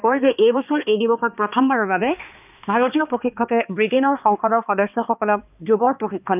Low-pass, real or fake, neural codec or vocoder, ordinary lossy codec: 3.6 kHz; fake; codec, 16 kHz, 2 kbps, X-Codec, HuBERT features, trained on balanced general audio; none